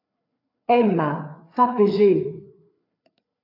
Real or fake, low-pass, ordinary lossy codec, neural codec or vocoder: fake; 5.4 kHz; AAC, 32 kbps; codec, 16 kHz, 4 kbps, FreqCodec, larger model